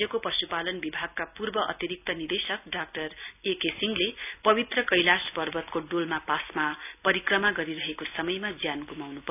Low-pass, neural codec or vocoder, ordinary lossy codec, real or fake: 3.6 kHz; none; none; real